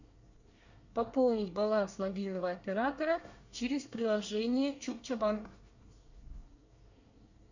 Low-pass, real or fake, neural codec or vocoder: 7.2 kHz; fake; codec, 24 kHz, 1 kbps, SNAC